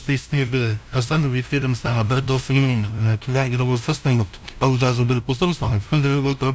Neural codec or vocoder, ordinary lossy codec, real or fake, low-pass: codec, 16 kHz, 0.5 kbps, FunCodec, trained on LibriTTS, 25 frames a second; none; fake; none